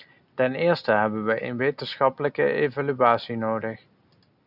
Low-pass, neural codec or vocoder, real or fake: 5.4 kHz; none; real